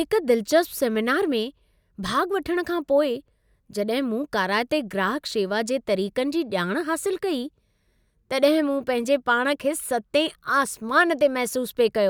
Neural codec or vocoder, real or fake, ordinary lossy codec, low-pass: none; real; none; none